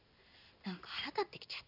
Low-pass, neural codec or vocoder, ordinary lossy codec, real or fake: 5.4 kHz; codec, 16 kHz in and 24 kHz out, 1 kbps, XY-Tokenizer; none; fake